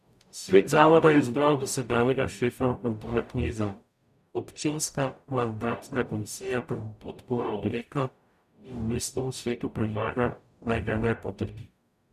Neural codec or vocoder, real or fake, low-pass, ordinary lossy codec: codec, 44.1 kHz, 0.9 kbps, DAC; fake; 14.4 kHz; none